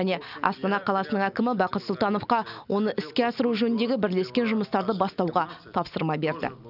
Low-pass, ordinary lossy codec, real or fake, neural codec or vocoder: 5.4 kHz; none; real; none